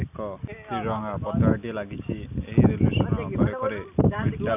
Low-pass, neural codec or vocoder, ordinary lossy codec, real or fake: 3.6 kHz; none; none; real